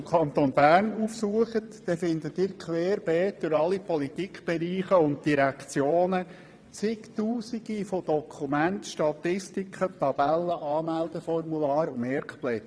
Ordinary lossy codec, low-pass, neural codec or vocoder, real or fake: none; none; vocoder, 22.05 kHz, 80 mel bands, WaveNeXt; fake